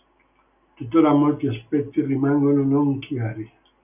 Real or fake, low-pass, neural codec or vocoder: real; 3.6 kHz; none